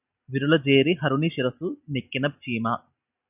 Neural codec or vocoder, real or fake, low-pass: none; real; 3.6 kHz